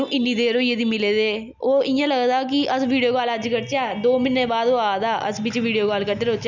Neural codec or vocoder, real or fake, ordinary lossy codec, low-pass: none; real; none; 7.2 kHz